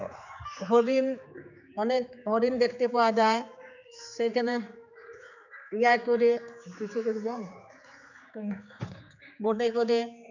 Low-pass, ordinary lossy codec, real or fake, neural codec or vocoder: 7.2 kHz; none; fake; codec, 16 kHz, 4 kbps, X-Codec, HuBERT features, trained on general audio